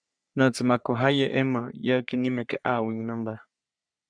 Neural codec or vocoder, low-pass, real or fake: codec, 44.1 kHz, 3.4 kbps, Pupu-Codec; 9.9 kHz; fake